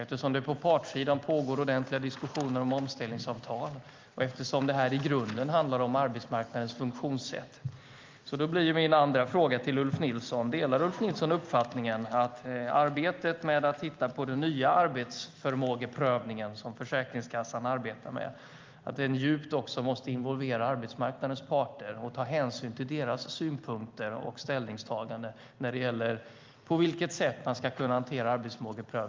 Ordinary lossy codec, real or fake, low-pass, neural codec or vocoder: Opus, 24 kbps; real; 7.2 kHz; none